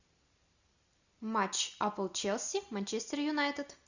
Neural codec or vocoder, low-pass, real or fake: none; 7.2 kHz; real